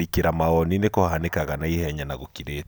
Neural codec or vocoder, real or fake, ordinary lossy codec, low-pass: none; real; none; none